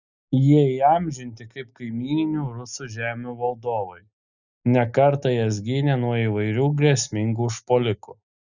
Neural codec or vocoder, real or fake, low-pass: none; real; 7.2 kHz